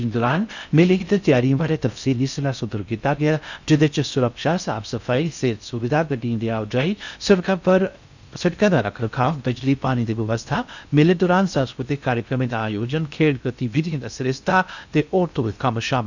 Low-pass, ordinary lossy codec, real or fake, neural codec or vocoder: 7.2 kHz; none; fake; codec, 16 kHz in and 24 kHz out, 0.6 kbps, FocalCodec, streaming, 4096 codes